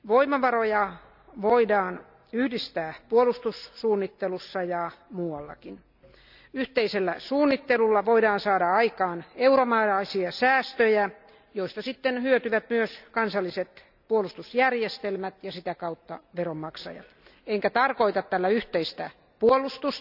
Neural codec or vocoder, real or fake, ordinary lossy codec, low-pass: none; real; none; 5.4 kHz